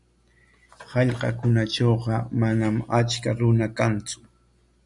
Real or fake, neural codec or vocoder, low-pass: real; none; 10.8 kHz